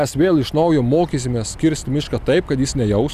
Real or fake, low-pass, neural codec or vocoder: real; 14.4 kHz; none